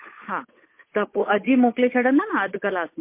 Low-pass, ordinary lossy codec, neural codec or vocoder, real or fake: 3.6 kHz; MP3, 24 kbps; codec, 16 kHz, 8 kbps, FunCodec, trained on Chinese and English, 25 frames a second; fake